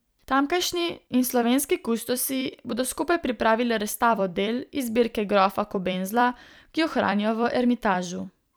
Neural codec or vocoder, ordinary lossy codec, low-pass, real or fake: vocoder, 44.1 kHz, 128 mel bands every 256 samples, BigVGAN v2; none; none; fake